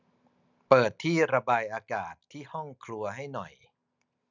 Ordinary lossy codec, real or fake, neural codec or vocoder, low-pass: none; real; none; 7.2 kHz